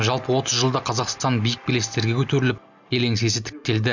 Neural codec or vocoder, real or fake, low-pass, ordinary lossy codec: none; real; 7.2 kHz; none